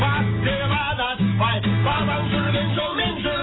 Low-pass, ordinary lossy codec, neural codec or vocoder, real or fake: 7.2 kHz; AAC, 16 kbps; none; real